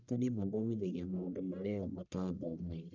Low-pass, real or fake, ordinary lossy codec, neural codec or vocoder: 7.2 kHz; fake; none; codec, 44.1 kHz, 1.7 kbps, Pupu-Codec